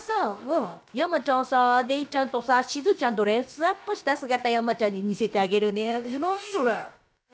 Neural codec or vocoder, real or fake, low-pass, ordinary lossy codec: codec, 16 kHz, about 1 kbps, DyCAST, with the encoder's durations; fake; none; none